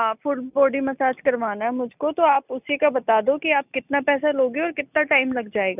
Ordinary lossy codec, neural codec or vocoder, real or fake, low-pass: none; none; real; 3.6 kHz